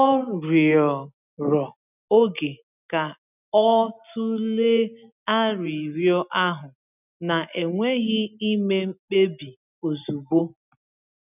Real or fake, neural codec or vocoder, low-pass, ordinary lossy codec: real; none; 3.6 kHz; none